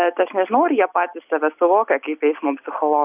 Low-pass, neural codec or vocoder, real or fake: 3.6 kHz; none; real